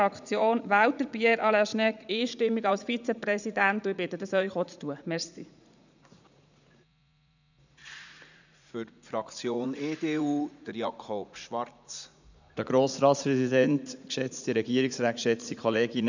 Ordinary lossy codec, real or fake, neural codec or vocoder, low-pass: none; fake; vocoder, 44.1 kHz, 80 mel bands, Vocos; 7.2 kHz